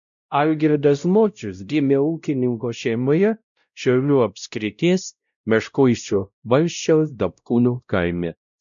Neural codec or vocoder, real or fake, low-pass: codec, 16 kHz, 0.5 kbps, X-Codec, WavLM features, trained on Multilingual LibriSpeech; fake; 7.2 kHz